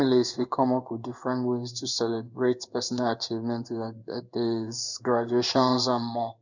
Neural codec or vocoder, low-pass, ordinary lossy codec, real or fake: codec, 16 kHz in and 24 kHz out, 1 kbps, XY-Tokenizer; 7.2 kHz; none; fake